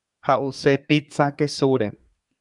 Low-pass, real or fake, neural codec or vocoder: 10.8 kHz; fake; codec, 24 kHz, 1 kbps, SNAC